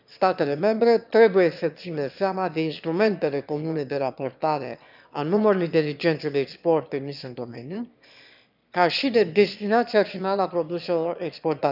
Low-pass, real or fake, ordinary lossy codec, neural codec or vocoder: 5.4 kHz; fake; none; autoencoder, 22.05 kHz, a latent of 192 numbers a frame, VITS, trained on one speaker